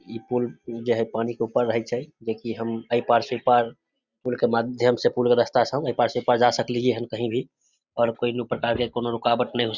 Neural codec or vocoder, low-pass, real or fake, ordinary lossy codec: none; 7.2 kHz; real; Opus, 64 kbps